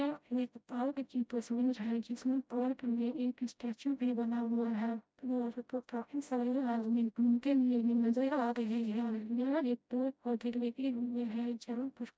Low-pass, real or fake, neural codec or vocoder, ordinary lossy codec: none; fake; codec, 16 kHz, 0.5 kbps, FreqCodec, smaller model; none